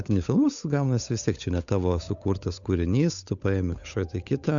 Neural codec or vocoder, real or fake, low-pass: codec, 16 kHz, 8 kbps, FunCodec, trained on Chinese and English, 25 frames a second; fake; 7.2 kHz